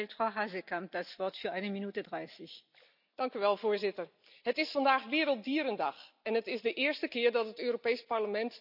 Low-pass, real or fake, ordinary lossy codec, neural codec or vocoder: 5.4 kHz; real; none; none